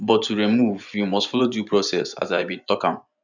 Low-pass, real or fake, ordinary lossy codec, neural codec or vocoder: 7.2 kHz; fake; none; vocoder, 24 kHz, 100 mel bands, Vocos